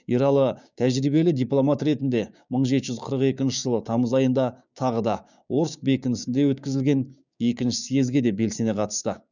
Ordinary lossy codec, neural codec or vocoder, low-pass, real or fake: none; codec, 16 kHz, 6 kbps, DAC; 7.2 kHz; fake